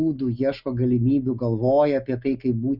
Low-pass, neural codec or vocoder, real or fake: 5.4 kHz; none; real